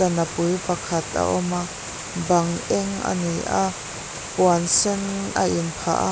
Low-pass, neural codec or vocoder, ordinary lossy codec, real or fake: none; none; none; real